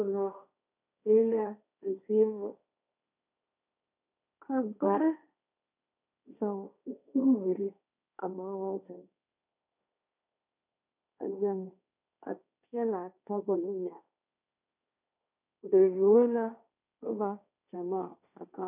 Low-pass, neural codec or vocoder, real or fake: 3.6 kHz; codec, 16 kHz, 1.1 kbps, Voila-Tokenizer; fake